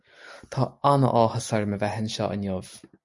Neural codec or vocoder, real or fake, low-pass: none; real; 10.8 kHz